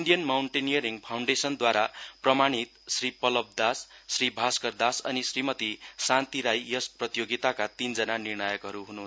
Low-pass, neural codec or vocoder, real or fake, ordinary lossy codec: none; none; real; none